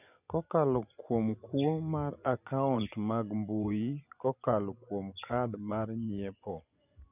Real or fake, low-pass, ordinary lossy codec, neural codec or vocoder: fake; 3.6 kHz; none; vocoder, 24 kHz, 100 mel bands, Vocos